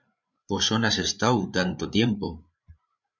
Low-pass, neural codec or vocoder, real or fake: 7.2 kHz; codec, 16 kHz, 8 kbps, FreqCodec, larger model; fake